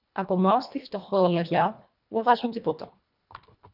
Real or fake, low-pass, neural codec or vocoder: fake; 5.4 kHz; codec, 24 kHz, 1.5 kbps, HILCodec